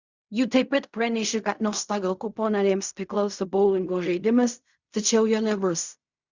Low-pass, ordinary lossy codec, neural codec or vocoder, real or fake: 7.2 kHz; Opus, 64 kbps; codec, 16 kHz in and 24 kHz out, 0.4 kbps, LongCat-Audio-Codec, fine tuned four codebook decoder; fake